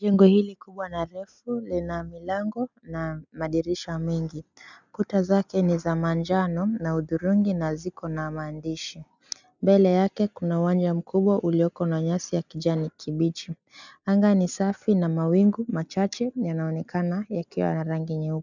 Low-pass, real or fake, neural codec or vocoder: 7.2 kHz; real; none